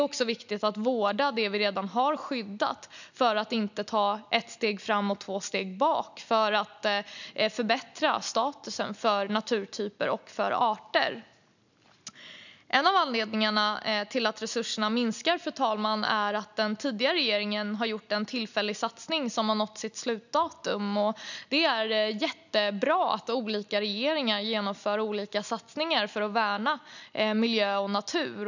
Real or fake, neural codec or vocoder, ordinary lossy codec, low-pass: real; none; none; 7.2 kHz